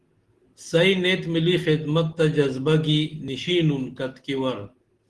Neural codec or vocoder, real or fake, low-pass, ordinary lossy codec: none; real; 10.8 kHz; Opus, 16 kbps